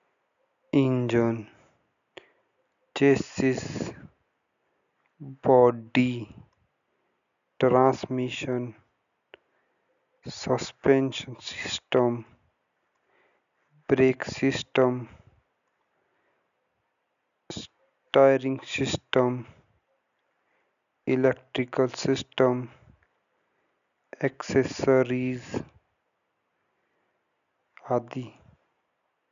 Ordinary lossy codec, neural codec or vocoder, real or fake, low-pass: none; none; real; 7.2 kHz